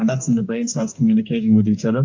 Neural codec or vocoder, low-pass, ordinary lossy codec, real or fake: codec, 44.1 kHz, 2.6 kbps, DAC; 7.2 kHz; AAC, 48 kbps; fake